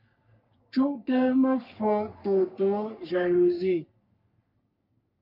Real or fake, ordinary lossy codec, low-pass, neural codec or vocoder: fake; MP3, 48 kbps; 5.4 kHz; codec, 44.1 kHz, 3.4 kbps, Pupu-Codec